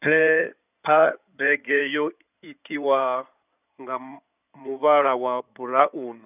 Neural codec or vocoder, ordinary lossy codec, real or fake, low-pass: codec, 16 kHz in and 24 kHz out, 2.2 kbps, FireRedTTS-2 codec; none; fake; 3.6 kHz